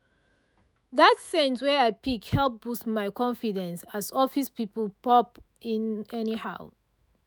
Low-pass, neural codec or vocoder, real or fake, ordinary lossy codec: none; autoencoder, 48 kHz, 128 numbers a frame, DAC-VAE, trained on Japanese speech; fake; none